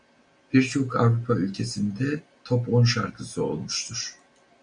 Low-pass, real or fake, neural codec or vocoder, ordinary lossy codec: 9.9 kHz; real; none; MP3, 96 kbps